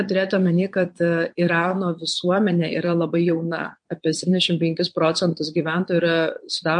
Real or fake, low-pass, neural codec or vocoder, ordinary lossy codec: fake; 9.9 kHz; vocoder, 22.05 kHz, 80 mel bands, Vocos; MP3, 48 kbps